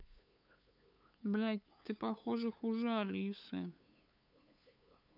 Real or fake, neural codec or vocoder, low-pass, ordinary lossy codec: fake; codec, 16 kHz, 8 kbps, FunCodec, trained on LibriTTS, 25 frames a second; 5.4 kHz; none